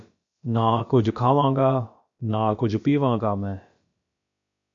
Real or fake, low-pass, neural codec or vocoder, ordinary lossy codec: fake; 7.2 kHz; codec, 16 kHz, about 1 kbps, DyCAST, with the encoder's durations; MP3, 48 kbps